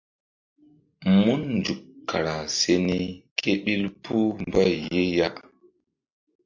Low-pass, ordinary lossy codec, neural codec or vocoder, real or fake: 7.2 kHz; MP3, 48 kbps; none; real